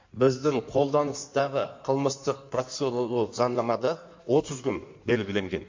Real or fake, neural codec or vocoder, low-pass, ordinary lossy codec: fake; codec, 16 kHz in and 24 kHz out, 1.1 kbps, FireRedTTS-2 codec; 7.2 kHz; MP3, 48 kbps